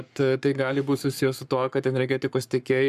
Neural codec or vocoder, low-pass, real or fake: codec, 44.1 kHz, 7.8 kbps, Pupu-Codec; 14.4 kHz; fake